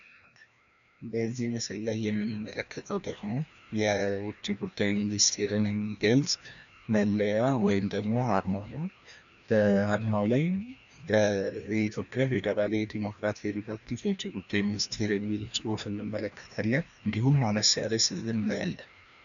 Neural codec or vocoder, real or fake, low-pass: codec, 16 kHz, 1 kbps, FreqCodec, larger model; fake; 7.2 kHz